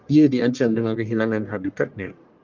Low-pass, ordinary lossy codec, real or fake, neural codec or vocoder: 7.2 kHz; Opus, 32 kbps; fake; codec, 44.1 kHz, 1.7 kbps, Pupu-Codec